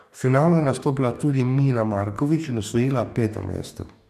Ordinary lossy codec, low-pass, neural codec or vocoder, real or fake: none; 14.4 kHz; codec, 44.1 kHz, 2.6 kbps, DAC; fake